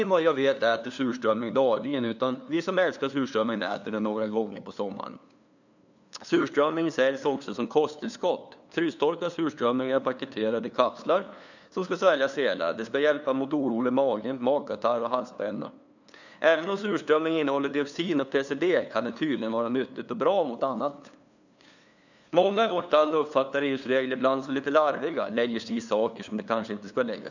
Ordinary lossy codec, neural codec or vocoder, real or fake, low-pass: none; codec, 16 kHz, 2 kbps, FunCodec, trained on LibriTTS, 25 frames a second; fake; 7.2 kHz